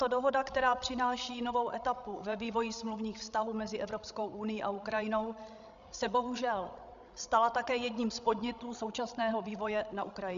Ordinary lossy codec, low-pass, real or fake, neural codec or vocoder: MP3, 96 kbps; 7.2 kHz; fake; codec, 16 kHz, 16 kbps, FreqCodec, larger model